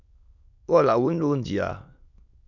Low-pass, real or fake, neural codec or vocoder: 7.2 kHz; fake; autoencoder, 22.05 kHz, a latent of 192 numbers a frame, VITS, trained on many speakers